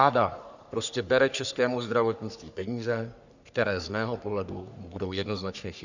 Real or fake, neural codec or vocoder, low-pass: fake; codec, 44.1 kHz, 3.4 kbps, Pupu-Codec; 7.2 kHz